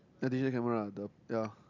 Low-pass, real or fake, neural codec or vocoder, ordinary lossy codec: 7.2 kHz; real; none; none